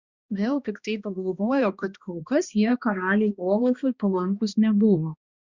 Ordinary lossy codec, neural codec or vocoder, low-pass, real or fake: Opus, 64 kbps; codec, 16 kHz, 1 kbps, X-Codec, HuBERT features, trained on balanced general audio; 7.2 kHz; fake